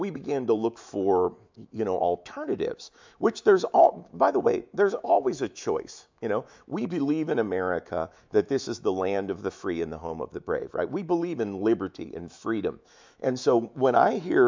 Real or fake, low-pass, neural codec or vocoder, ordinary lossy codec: fake; 7.2 kHz; autoencoder, 48 kHz, 128 numbers a frame, DAC-VAE, trained on Japanese speech; MP3, 64 kbps